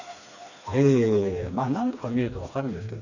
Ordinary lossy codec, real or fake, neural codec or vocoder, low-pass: none; fake; codec, 16 kHz, 2 kbps, FreqCodec, smaller model; 7.2 kHz